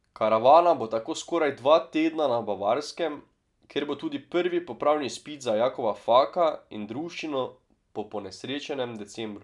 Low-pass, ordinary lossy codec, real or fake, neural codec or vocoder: 10.8 kHz; none; real; none